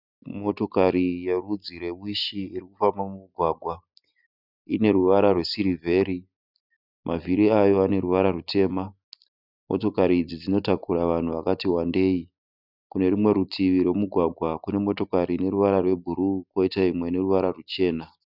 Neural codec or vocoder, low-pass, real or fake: none; 5.4 kHz; real